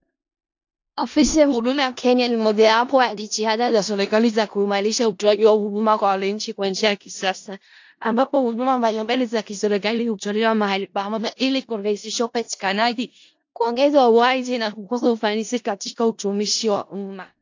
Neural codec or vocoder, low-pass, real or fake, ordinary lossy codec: codec, 16 kHz in and 24 kHz out, 0.4 kbps, LongCat-Audio-Codec, four codebook decoder; 7.2 kHz; fake; AAC, 48 kbps